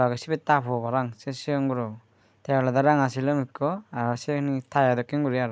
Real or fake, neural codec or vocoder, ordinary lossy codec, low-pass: real; none; none; none